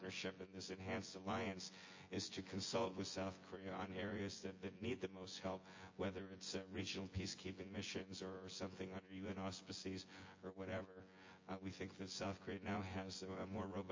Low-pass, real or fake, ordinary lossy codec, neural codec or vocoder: 7.2 kHz; fake; MP3, 32 kbps; vocoder, 24 kHz, 100 mel bands, Vocos